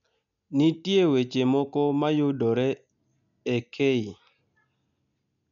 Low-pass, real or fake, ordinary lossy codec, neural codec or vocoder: 7.2 kHz; real; none; none